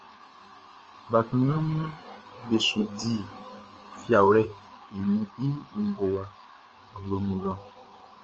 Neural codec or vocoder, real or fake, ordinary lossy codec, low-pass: codec, 16 kHz, 4 kbps, FreqCodec, larger model; fake; Opus, 24 kbps; 7.2 kHz